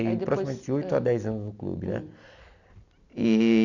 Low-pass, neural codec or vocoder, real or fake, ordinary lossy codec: 7.2 kHz; none; real; none